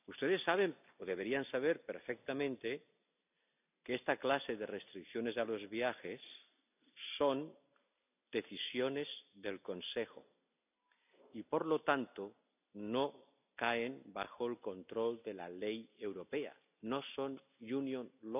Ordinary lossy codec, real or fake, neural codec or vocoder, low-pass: none; real; none; 3.6 kHz